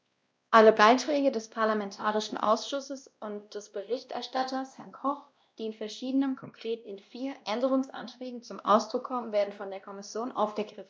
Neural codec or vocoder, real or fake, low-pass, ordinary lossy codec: codec, 16 kHz, 1 kbps, X-Codec, WavLM features, trained on Multilingual LibriSpeech; fake; none; none